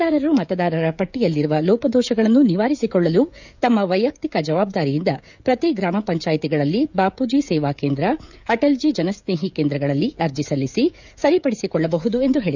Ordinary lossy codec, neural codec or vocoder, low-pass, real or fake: none; codec, 16 kHz, 16 kbps, FreqCodec, smaller model; 7.2 kHz; fake